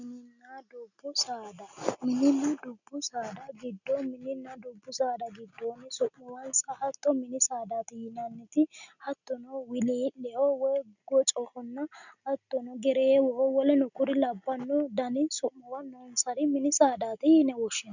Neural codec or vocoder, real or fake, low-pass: none; real; 7.2 kHz